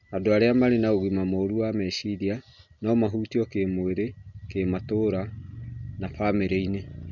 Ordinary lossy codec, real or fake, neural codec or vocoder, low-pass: none; real; none; 7.2 kHz